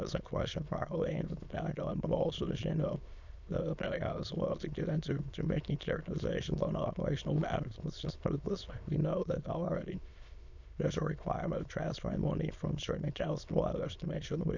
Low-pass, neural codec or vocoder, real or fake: 7.2 kHz; autoencoder, 22.05 kHz, a latent of 192 numbers a frame, VITS, trained on many speakers; fake